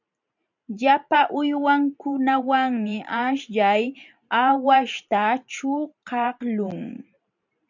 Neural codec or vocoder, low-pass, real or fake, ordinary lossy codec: vocoder, 44.1 kHz, 128 mel bands every 512 samples, BigVGAN v2; 7.2 kHz; fake; MP3, 64 kbps